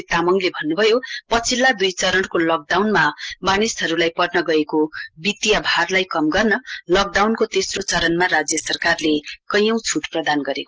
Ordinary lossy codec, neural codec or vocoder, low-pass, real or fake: Opus, 16 kbps; none; 7.2 kHz; real